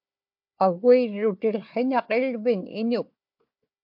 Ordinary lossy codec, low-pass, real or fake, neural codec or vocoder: MP3, 48 kbps; 5.4 kHz; fake; codec, 16 kHz, 4 kbps, FunCodec, trained on Chinese and English, 50 frames a second